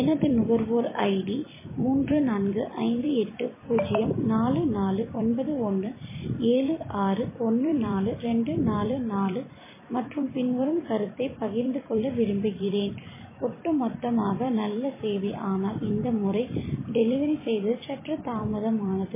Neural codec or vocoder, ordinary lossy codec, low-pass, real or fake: vocoder, 44.1 kHz, 128 mel bands every 256 samples, BigVGAN v2; MP3, 16 kbps; 3.6 kHz; fake